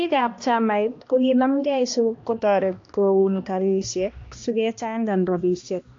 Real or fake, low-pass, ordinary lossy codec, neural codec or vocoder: fake; 7.2 kHz; AAC, 48 kbps; codec, 16 kHz, 1 kbps, X-Codec, HuBERT features, trained on balanced general audio